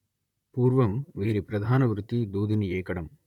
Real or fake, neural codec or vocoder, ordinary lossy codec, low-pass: fake; vocoder, 44.1 kHz, 128 mel bands, Pupu-Vocoder; none; 19.8 kHz